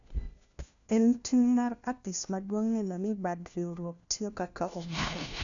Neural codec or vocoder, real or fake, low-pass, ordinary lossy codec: codec, 16 kHz, 1 kbps, FunCodec, trained on LibriTTS, 50 frames a second; fake; 7.2 kHz; none